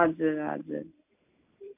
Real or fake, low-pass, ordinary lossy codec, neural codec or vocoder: real; 3.6 kHz; MP3, 32 kbps; none